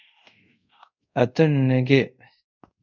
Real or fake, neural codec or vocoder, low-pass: fake; codec, 24 kHz, 0.5 kbps, DualCodec; 7.2 kHz